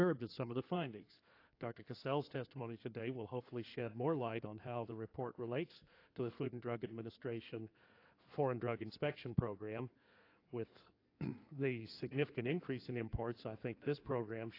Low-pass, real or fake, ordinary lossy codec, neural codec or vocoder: 5.4 kHz; fake; AAC, 32 kbps; codec, 16 kHz in and 24 kHz out, 2.2 kbps, FireRedTTS-2 codec